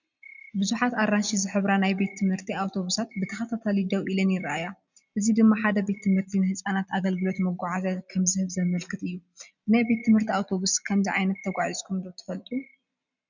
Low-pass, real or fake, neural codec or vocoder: 7.2 kHz; real; none